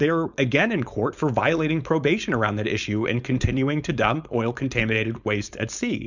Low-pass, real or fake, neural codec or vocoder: 7.2 kHz; fake; codec, 16 kHz, 4.8 kbps, FACodec